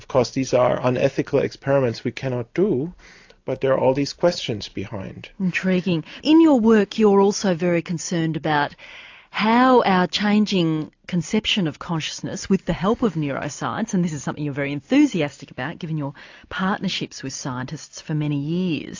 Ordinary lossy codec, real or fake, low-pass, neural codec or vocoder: AAC, 48 kbps; real; 7.2 kHz; none